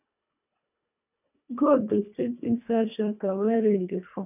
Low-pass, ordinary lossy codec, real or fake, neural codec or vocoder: 3.6 kHz; none; fake; codec, 24 kHz, 1.5 kbps, HILCodec